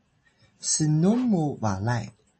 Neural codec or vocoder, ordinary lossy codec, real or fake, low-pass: none; MP3, 32 kbps; real; 10.8 kHz